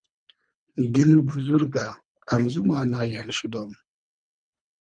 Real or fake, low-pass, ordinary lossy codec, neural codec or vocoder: fake; 9.9 kHz; MP3, 96 kbps; codec, 24 kHz, 3 kbps, HILCodec